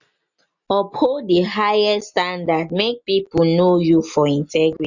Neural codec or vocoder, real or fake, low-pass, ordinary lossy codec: none; real; 7.2 kHz; none